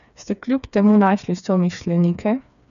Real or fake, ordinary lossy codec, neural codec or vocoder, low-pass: fake; none; codec, 16 kHz, 4 kbps, FreqCodec, smaller model; 7.2 kHz